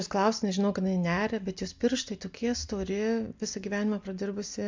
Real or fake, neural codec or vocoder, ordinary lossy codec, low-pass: real; none; MP3, 64 kbps; 7.2 kHz